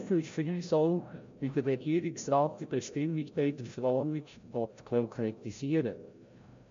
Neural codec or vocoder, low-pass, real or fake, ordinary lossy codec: codec, 16 kHz, 0.5 kbps, FreqCodec, larger model; 7.2 kHz; fake; MP3, 48 kbps